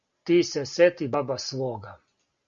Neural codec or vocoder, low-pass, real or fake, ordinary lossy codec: none; 7.2 kHz; real; Opus, 64 kbps